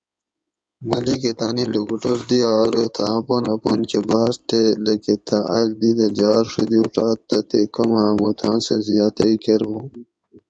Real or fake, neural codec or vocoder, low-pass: fake; codec, 16 kHz in and 24 kHz out, 2.2 kbps, FireRedTTS-2 codec; 9.9 kHz